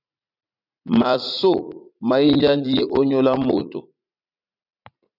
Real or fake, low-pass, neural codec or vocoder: fake; 5.4 kHz; vocoder, 44.1 kHz, 80 mel bands, Vocos